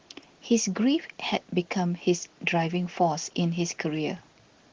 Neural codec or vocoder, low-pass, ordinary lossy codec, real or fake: none; 7.2 kHz; Opus, 16 kbps; real